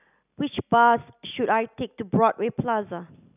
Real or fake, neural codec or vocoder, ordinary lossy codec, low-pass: real; none; none; 3.6 kHz